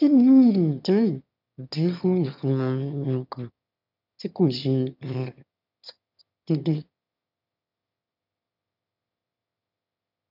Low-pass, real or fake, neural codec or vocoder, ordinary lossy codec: 5.4 kHz; fake; autoencoder, 22.05 kHz, a latent of 192 numbers a frame, VITS, trained on one speaker; none